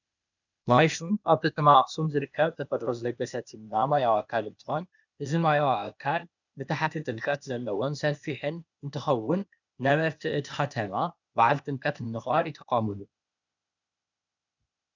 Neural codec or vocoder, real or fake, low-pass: codec, 16 kHz, 0.8 kbps, ZipCodec; fake; 7.2 kHz